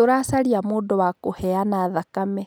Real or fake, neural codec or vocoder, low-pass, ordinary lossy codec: real; none; none; none